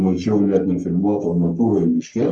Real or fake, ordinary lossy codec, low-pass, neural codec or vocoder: fake; Opus, 64 kbps; 9.9 kHz; codec, 44.1 kHz, 3.4 kbps, Pupu-Codec